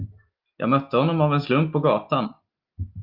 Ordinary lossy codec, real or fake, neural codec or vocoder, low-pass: Opus, 24 kbps; real; none; 5.4 kHz